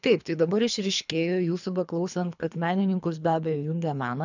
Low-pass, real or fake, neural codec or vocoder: 7.2 kHz; fake; codec, 24 kHz, 3 kbps, HILCodec